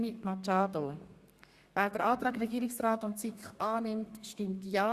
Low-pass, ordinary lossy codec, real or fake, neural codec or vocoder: 14.4 kHz; Opus, 64 kbps; fake; codec, 32 kHz, 1.9 kbps, SNAC